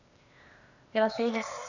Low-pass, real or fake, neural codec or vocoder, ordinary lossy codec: 7.2 kHz; fake; codec, 16 kHz, 0.8 kbps, ZipCodec; none